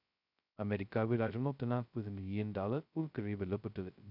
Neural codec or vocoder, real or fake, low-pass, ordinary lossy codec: codec, 16 kHz, 0.2 kbps, FocalCodec; fake; 5.4 kHz; none